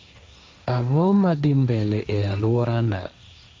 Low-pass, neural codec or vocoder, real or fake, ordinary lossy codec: none; codec, 16 kHz, 1.1 kbps, Voila-Tokenizer; fake; none